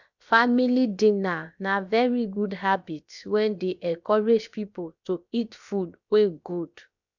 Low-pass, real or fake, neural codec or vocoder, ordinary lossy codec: 7.2 kHz; fake; codec, 16 kHz, about 1 kbps, DyCAST, with the encoder's durations; none